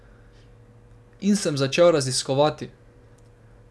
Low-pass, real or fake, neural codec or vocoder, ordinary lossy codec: none; real; none; none